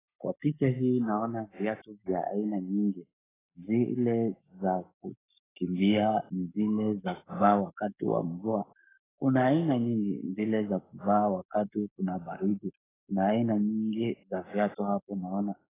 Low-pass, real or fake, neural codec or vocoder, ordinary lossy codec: 3.6 kHz; fake; codec, 44.1 kHz, 7.8 kbps, Pupu-Codec; AAC, 16 kbps